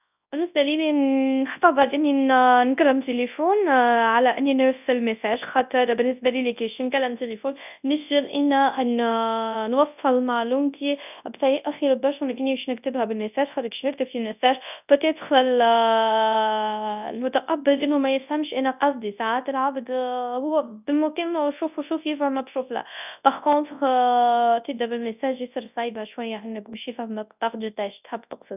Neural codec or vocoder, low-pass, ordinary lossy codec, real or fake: codec, 24 kHz, 0.9 kbps, WavTokenizer, large speech release; 3.6 kHz; none; fake